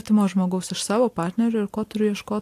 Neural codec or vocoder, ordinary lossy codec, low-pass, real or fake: none; AAC, 64 kbps; 14.4 kHz; real